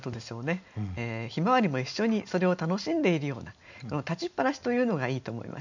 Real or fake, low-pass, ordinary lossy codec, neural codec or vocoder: real; 7.2 kHz; none; none